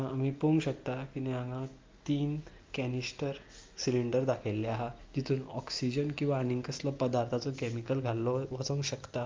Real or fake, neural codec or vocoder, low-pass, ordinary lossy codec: real; none; 7.2 kHz; Opus, 24 kbps